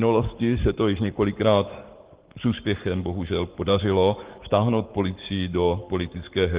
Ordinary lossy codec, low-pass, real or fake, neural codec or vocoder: Opus, 16 kbps; 3.6 kHz; fake; codec, 44.1 kHz, 7.8 kbps, Pupu-Codec